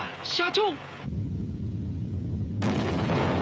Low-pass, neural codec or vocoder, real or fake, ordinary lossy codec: none; codec, 16 kHz, 16 kbps, FreqCodec, smaller model; fake; none